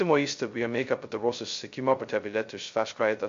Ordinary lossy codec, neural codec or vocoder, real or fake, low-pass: MP3, 48 kbps; codec, 16 kHz, 0.2 kbps, FocalCodec; fake; 7.2 kHz